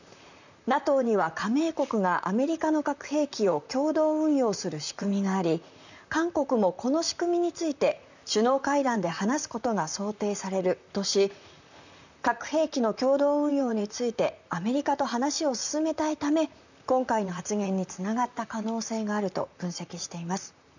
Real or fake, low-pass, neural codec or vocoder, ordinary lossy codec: fake; 7.2 kHz; vocoder, 44.1 kHz, 128 mel bands, Pupu-Vocoder; none